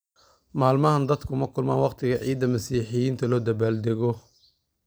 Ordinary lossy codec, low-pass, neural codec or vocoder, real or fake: none; none; vocoder, 44.1 kHz, 128 mel bands every 256 samples, BigVGAN v2; fake